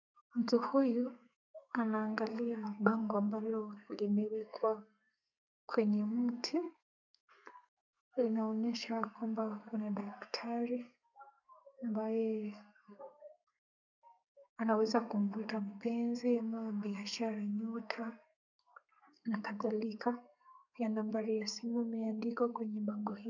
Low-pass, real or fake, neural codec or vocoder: 7.2 kHz; fake; codec, 32 kHz, 1.9 kbps, SNAC